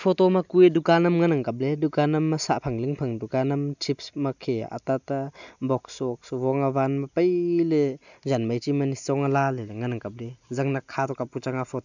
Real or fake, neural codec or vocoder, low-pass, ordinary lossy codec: real; none; 7.2 kHz; none